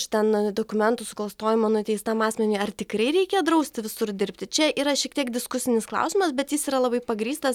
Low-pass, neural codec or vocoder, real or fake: 19.8 kHz; none; real